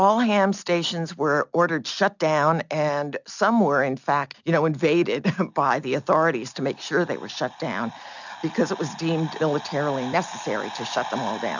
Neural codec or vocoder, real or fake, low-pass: none; real; 7.2 kHz